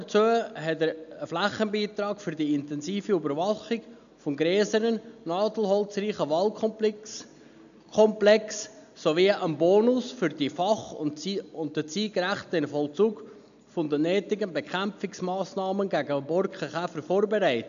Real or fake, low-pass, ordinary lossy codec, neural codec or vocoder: real; 7.2 kHz; none; none